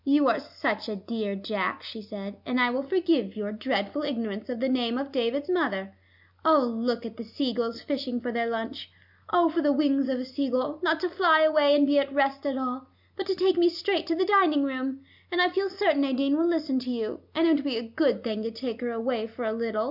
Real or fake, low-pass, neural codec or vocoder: real; 5.4 kHz; none